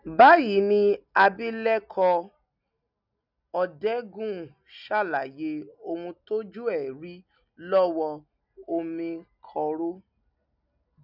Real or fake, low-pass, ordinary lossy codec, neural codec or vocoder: real; 5.4 kHz; none; none